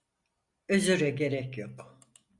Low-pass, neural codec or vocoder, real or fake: 10.8 kHz; none; real